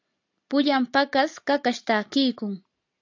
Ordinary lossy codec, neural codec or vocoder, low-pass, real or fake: AAC, 48 kbps; none; 7.2 kHz; real